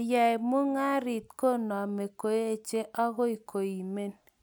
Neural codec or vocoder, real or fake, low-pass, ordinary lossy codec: none; real; none; none